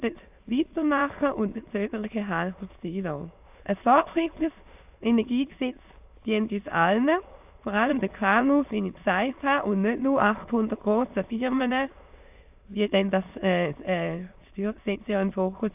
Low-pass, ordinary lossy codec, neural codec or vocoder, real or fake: 3.6 kHz; AAC, 32 kbps; autoencoder, 22.05 kHz, a latent of 192 numbers a frame, VITS, trained on many speakers; fake